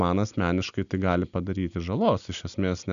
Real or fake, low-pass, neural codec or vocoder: real; 7.2 kHz; none